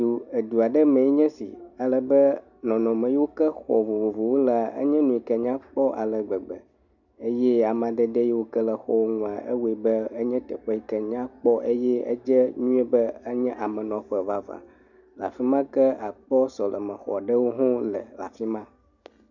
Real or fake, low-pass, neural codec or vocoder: real; 7.2 kHz; none